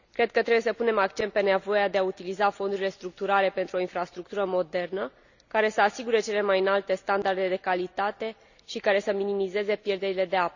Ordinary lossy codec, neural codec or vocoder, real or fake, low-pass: none; none; real; 7.2 kHz